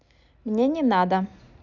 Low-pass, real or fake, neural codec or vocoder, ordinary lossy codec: 7.2 kHz; real; none; none